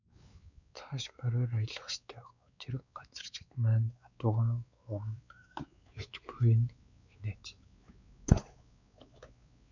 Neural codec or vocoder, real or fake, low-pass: codec, 16 kHz, 4 kbps, X-Codec, WavLM features, trained on Multilingual LibriSpeech; fake; 7.2 kHz